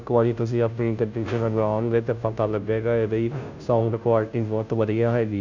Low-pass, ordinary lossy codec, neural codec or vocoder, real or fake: 7.2 kHz; none; codec, 16 kHz, 0.5 kbps, FunCodec, trained on Chinese and English, 25 frames a second; fake